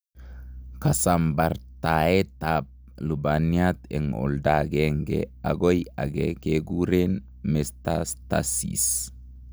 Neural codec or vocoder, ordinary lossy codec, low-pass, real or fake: none; none; none; real